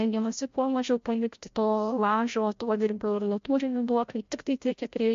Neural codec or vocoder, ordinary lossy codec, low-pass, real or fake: codec, 16 kHz, 0.5 kbps, FreqCodec, larger model; MP3, 48 kbps; 7.2 kHz; fake